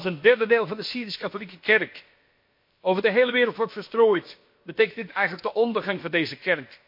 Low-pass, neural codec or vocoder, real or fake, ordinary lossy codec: 5.4 kHz; codec, 16 kHz, about 1 kbps, DyCAST, with the encoder's durations; fake; MP3, 32 kbps